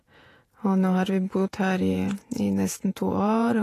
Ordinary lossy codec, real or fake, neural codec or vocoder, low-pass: AAC, 48 kbps; fake; vocoder, 48 kHz, 128 mel bands, Vocos; 14.4 kHz